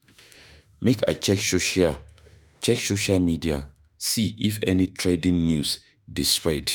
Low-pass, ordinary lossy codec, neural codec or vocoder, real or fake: none; none; autoencoder, 48 kHz, 32 numbers a frame, DAC-VAE, trained on Japanese speech; fake